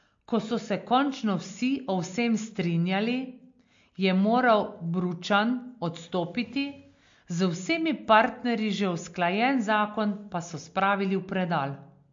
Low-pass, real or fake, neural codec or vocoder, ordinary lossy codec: 7.2 kHz; real; none; MP3, 48 kbps